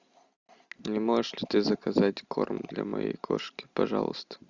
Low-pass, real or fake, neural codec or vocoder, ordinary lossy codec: 7.2 kHz; real; none; Opus, 64 kbps